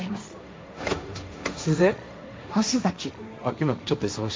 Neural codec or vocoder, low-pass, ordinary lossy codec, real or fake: codec, 16 kHz, 1.1 kbps, Voila-Tokenizer; none; none; fake